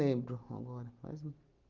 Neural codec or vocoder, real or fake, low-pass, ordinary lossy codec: none; real; 7.2 kHz; Opus, 32 kbps